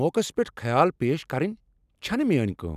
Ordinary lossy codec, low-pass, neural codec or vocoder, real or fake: none; 14.4 kHz; vocoder, 44.1 kHz, 128 mel bands every 256 samples, BigVGAN v2; fake